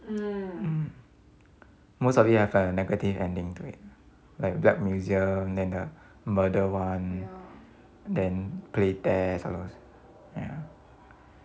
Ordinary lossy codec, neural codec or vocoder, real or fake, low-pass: none; none; real; none